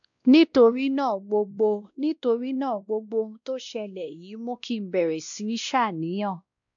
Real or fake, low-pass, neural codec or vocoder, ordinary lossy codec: fake; 7.2 kHz; codec, 16 kHz, 1 kbps, X-Codec, WavLM features, trained on Multilingual LibriSpeech; AAC, 64 kbps